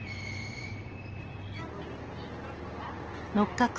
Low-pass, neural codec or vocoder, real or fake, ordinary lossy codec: 7.2 kHz; none; real; Opus, 16 kbps